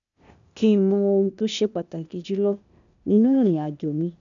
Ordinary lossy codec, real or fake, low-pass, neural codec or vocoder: none; fake; 7.2 kHz; codec, 16 kHz, 0.8 kbps, ZipCodec